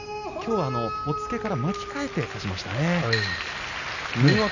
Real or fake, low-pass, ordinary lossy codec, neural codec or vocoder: real; 7.2 kHz; none; none